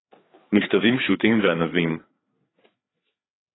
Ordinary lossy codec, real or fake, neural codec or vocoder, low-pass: AAC, 16 kbps; fake; codec, 16 kHz, 4 kbps, FreqCodec, larger model; 7.2 kHz